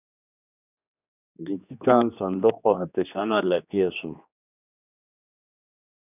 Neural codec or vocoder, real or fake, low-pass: codec, 16 kHz, 2 kbps, X-Codec, HuBERT features, trained on general audio; fake; 3.6 kHz